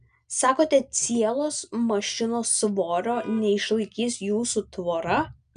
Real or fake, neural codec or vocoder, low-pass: real; none; 9.9 kHz